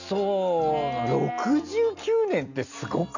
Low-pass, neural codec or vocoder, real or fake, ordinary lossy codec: 7.2 kHz; none; real; none